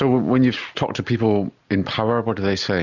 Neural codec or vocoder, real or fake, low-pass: none; real; 7.2 kHz